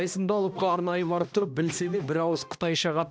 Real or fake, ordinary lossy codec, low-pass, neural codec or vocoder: fake; none; none; codec, 16 kHz, 1 kbps, X-Codec, HuBERT features, trained on balanced general audio